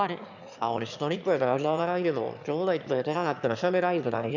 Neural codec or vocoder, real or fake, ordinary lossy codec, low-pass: autoencoder, 22.05 kHz, a latent of 192 numbers a frame, VITS, trained on one speaker; fake; none; 7.2 kHz